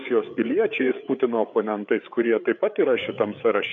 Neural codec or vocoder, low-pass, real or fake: codec, 16 kHz, 8 kbps, FreqCodec, larger model; 7.2 kHz; fake